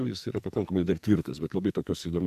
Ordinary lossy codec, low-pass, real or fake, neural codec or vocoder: MP3, 96 kbps; 14.4 kHz; fake; codec, 44.1 kHz, 2.6 kbps, SNAC